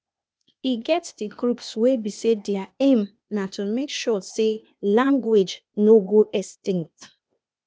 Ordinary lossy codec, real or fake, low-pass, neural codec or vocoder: none; fake; none; codec, 16 kHz, 0.8 kbps, ZipCodec